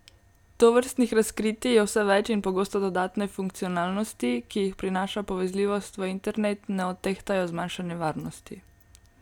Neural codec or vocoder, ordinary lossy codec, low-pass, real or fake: none; none; 19.8 kHz; real